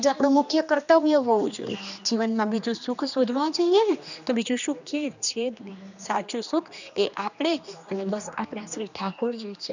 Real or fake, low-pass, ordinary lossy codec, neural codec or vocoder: fake; 7.2 kHz; none; codec, 16 kHz, 2 kbps, X-Codec, HuBERT features, trained on general audio